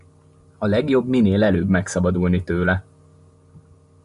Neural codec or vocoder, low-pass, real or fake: none; 10.8 kHz; real